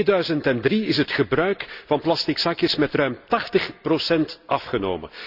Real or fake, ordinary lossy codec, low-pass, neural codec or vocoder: real; Opus, 64 kbps; 5.4 kHz; none